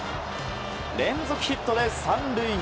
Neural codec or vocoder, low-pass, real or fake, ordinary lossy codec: none; none; real; none